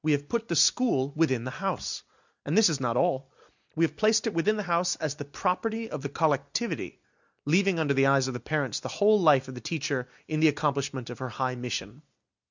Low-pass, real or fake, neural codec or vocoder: 7.2 kHz; real; none